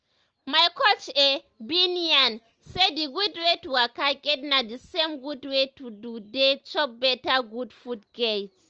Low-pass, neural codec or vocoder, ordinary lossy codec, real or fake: 7.2 kHz; none; Opus, 24 kbps; real